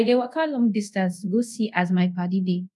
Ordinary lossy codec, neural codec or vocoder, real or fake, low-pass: none; codec, 24 kHz, 0.5 kbps, DualCodec; fake; none